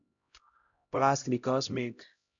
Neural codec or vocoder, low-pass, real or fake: codec, 16 kHz, 0.5 kbps, X-Codec, HuBERT features, trained on LibriSpeech; 7.2 kHz; fake